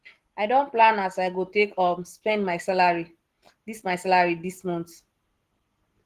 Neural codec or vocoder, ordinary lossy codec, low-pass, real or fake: none; Opus, 16 kbps; 14.4 kHz; real